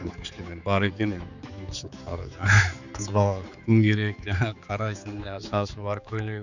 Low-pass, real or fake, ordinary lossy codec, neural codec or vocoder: 7.2 kHz; fake; none; codec, 16 kHz, 4 kbps, X-Codec, HuBERT features, trained on general audio